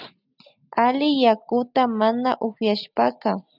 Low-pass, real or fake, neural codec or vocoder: 5.4 kHz; real; none